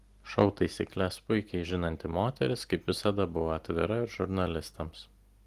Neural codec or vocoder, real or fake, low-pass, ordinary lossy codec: none; real; 14.4 kHz; Opus, 24 kbps